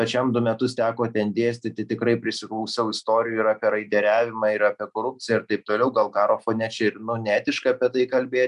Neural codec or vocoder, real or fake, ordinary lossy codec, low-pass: none; real; MP3, 96 kbps; 10.8 kHz